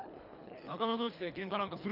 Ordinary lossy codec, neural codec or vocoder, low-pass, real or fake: none; codec, 24 kHz, 3 kbps, HILCodec; 5.4 kHz; fake